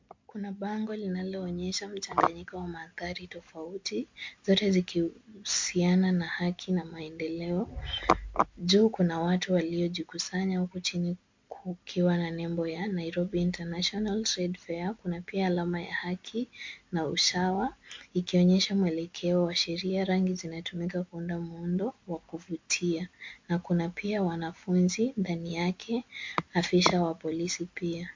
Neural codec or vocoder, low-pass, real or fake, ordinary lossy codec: none; 7.2 kHz; real; MP3, 48 kbps